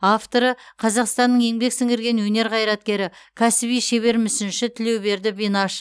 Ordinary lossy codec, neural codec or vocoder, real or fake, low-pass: none; none; real; none